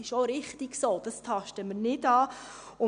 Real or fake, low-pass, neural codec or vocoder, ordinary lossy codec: real; 9.9 kHz; none; none